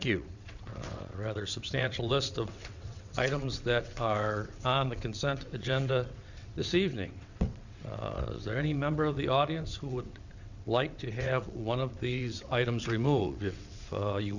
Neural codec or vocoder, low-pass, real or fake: vocoder, 22.05 kHz, 80 mel bands, WaveNeXt; 7.2 kHz; fake